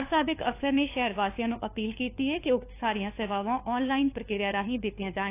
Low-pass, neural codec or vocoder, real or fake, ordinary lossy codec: 3.6 kHz; codec, 16 kHz, 4 kbps, FunCodec, trained on LibriTTS, 50 frames a second; fake; AAC, 24 kbps